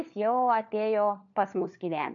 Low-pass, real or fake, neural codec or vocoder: 7.2 kHz; fake; codec, 16 kHz, 4 kbps, FunCodec, trained on LibriTTS, 50 frames a second